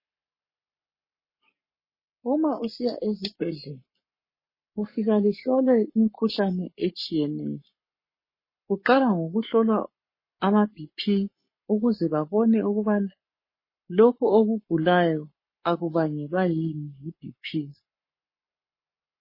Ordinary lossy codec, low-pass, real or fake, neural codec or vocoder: MP3, 24 kbps; 5.4 kHz; fake; codec, 44.1 kHz, 7.8 kbps, Pupu-Codec